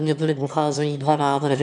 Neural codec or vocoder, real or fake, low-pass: autoencoder, 22.05 kHz, a latent of 192 numbers a frame, VITS, trained on one speaker; fake; 9.9 kHz